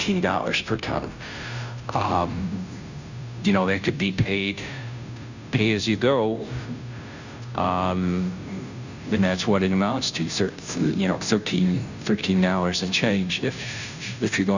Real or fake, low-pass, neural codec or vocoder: fake; 7.2 kHz; codec, 16 kHz, 0.5 kbps, FunCodec, trained on Chinese and English, 25 frames a second